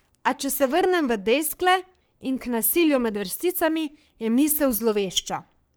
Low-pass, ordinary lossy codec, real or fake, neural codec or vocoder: none; none; fake; codec, 44.1 kHz, 3.4 kbps, Pupu-Codec